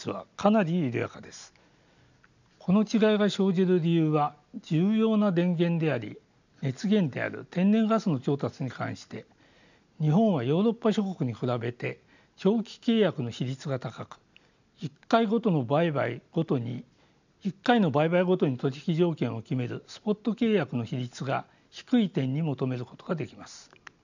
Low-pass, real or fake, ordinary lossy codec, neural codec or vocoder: 7.2 kHz; real; none; none